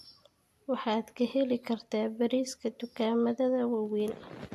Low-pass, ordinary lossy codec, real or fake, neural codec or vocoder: 14.4 kHz; AAC, 64 kbps; real; none